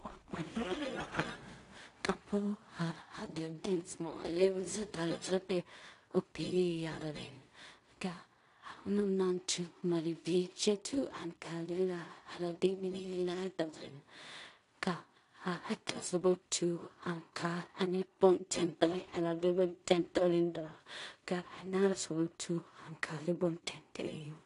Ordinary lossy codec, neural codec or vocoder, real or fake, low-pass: AAC, 48 kbps; codec, 16 kHz in and 24 kHz out, 0.4 kbps, LongCat-Audio-Codec, two codebook decoder; fake; 10.8 kHz